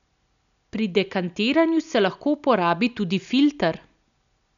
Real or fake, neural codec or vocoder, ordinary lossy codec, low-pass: real; none; none; 7.2 kHz